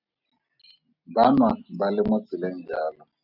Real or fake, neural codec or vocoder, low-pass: real; none; 5.4 kHz